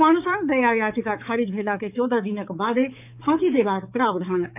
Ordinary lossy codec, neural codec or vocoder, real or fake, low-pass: none; codec, 16 kHz, 4 kbps, X-Codec, HuBERT features, trained on balanced general audio; fake; 3.6 kHz